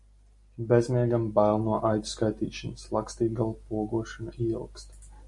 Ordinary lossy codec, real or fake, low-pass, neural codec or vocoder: MP3, 48 kbps; real; 10.8 kHz; none